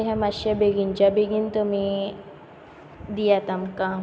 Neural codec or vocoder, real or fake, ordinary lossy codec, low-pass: none; real; none; none